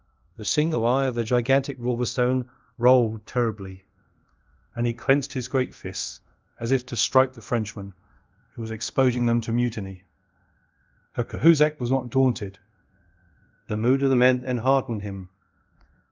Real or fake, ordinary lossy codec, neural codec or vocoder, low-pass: fake; Opus, 24 kbps; codec, 24 kHz, 0.5 kbps, DualCodec; 7.2 kHz